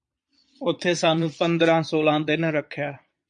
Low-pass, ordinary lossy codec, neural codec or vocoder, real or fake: 9.9 kHz; MP3, 64 kbps; vocoder, 22.05 kHz, 80 mel bands, Vocos; fake